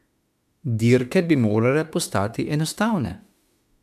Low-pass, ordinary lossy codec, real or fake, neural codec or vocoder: 14.4 kHz; MP3, 96 kbps; fake; autoencoder, 48 kHz, 32 numbers a frame, DAC-VAE, trained on Japanese speech